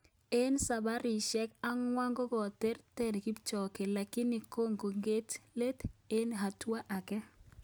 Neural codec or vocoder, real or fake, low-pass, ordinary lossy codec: none; real; none; none